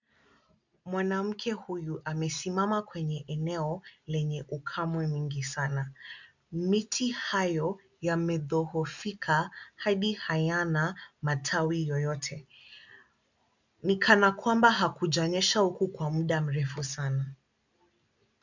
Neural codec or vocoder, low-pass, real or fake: none; 7.2 kHz; real